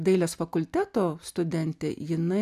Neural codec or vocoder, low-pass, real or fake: none; 14.4 kHz; real